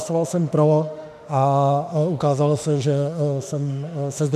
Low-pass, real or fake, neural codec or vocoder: 14.4 kHz; fake; autoencoder, 48 kHz, 32 numbers a frame, DAC-VAE, trained on Japanese speech